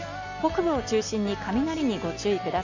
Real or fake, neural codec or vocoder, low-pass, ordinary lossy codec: real; none; 7.2 kHz; none